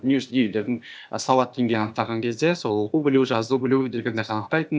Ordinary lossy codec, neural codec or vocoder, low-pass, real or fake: none; codec, 16 kHz, 0.8 kbps, ZipCodec; none; fake